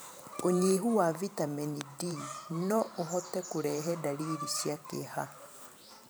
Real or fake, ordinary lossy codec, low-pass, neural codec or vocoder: fake; none; none; vocoder, 44.1 kHz, 128 mel bands every 512 samples, BigVGAN v2